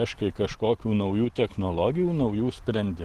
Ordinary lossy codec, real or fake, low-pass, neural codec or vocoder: Opus, 16 kbps; real; 14.4 kHz; none